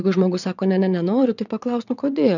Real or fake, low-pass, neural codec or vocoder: fake; 7.2 kHz; vocoder, 22.05 kHz, 80 mel bands, WaveNeXt